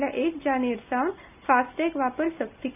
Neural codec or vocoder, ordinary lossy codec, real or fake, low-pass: none; none; real; 3.6 kHz